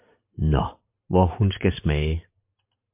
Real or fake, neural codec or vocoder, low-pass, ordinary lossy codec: fake; vocoder, 44.1 kHz, 128 mel bands every 512 samples, BigVGAN v2; 3.6 kHz; MP3, 32 kbps